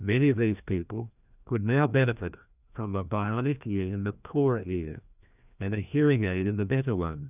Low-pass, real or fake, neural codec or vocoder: 3.6 kHz; fake; codec, 16 kHz, 1 kbps, FreqCodec, larger model